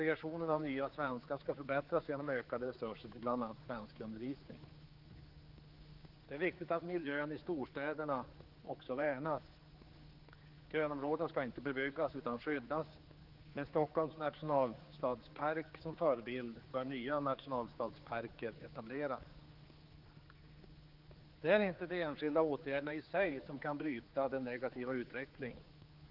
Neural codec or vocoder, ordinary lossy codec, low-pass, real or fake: codec, 16 kHz, 4 kbps, X-Codec, HuBERT features, trained on general audio; Opus, 24 kbps; 5.4 kHz; fake